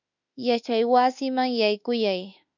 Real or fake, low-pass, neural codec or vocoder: fake; 7.2 kHz; autoencoder, 48 kHz, 32 numbers a frame, DAC-VAE, trained on Japanese speech